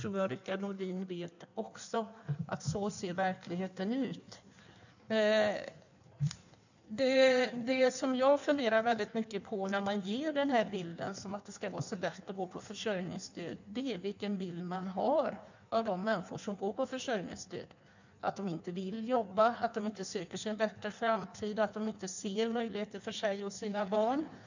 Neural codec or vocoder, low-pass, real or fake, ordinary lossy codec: codec, 16 kHz in and 24 kHz out, 1.1 kbps, FireRedTTS-2 codec; 7.2 kHz; fake; none